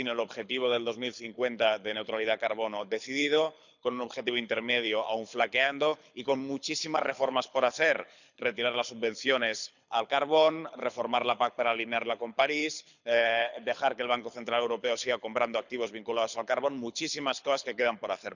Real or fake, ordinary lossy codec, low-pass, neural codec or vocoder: fake; none; 7.2 kHz; codec, 24 kHz, 6 kbps, HILCodec